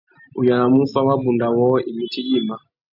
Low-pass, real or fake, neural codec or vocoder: 5.4 kHz; real; none